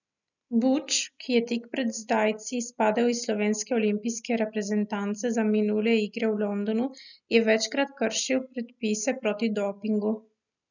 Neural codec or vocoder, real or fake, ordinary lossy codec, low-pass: none; real; none; 7.2 kHz